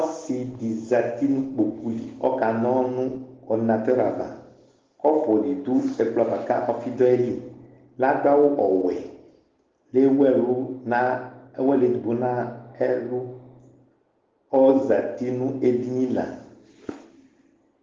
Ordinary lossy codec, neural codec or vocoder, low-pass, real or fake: Opus, 16 kbps; none; 7.2 kHz; real